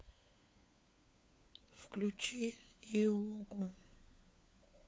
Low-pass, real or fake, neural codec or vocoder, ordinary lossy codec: none; fake; codec, 16 kHz, 4 kbps, FunCodec, trained on LibriTTS, 50 frames a second; none